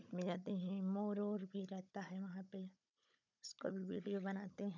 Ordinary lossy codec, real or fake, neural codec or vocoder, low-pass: none; fake; codec, 16 kHz, 16 kbps, FunCodec, trained on Chinese and English, 50 frames a second; 7.2 kHz